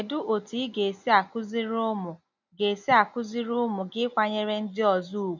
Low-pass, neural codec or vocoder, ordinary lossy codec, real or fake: 7.2 kHz; none; none; real